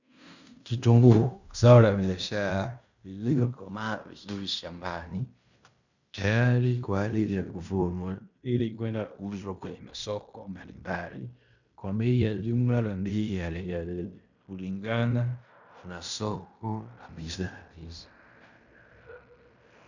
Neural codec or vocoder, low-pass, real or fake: codec, 16 kHz in and 24 kHz out, 0.9 kbps, LongCat-Audio-Codec, fine tuned four codebook decoder; 7.2 kHz; fake